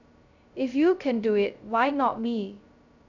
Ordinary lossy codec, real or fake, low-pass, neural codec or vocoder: none; fake; 7.2 kHz; codec, 16 kHz, 0.2 kbps, FocalCodec